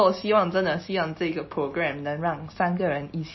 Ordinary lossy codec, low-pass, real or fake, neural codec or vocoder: MP3, 24 kbps; 7.2 kHz; real; none